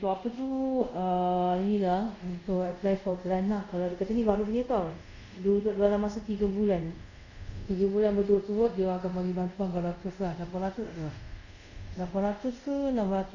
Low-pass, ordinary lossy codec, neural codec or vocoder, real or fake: 7.2 kHz; none; codec, 24 kHz, 0.5 kbps, DualCodec; fake